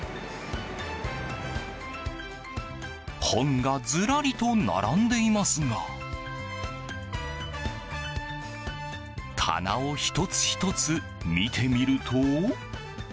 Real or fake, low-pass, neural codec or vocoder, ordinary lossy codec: real; none; none; none